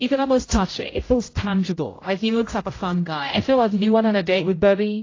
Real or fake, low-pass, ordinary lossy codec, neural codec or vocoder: fake; 7.2 kHz; AAC, 32 kbps; codec, 16 kHz, 0.5 kbps, X-Codec, HuBERT features, trained on general audio